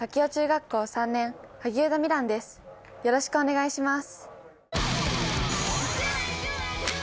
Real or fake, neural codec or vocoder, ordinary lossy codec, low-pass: real; none; none; none